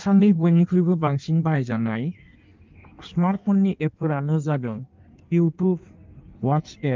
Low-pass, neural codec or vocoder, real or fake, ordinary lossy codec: 7.2 kHz; codec, 16 kHz in and 24 kHz out, 1.1 kbps, FireRedTTS-2 codec; fake; Opus, 24 kbps